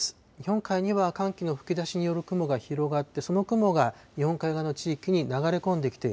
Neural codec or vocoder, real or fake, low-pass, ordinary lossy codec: none; real; none; none